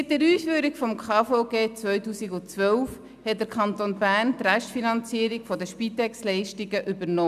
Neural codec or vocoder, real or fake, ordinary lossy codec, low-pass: none; real; none; 14.4 kHz